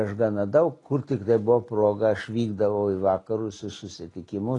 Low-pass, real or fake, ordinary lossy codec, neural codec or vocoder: 10.8 kHz; real; AAC, 32 kbps; none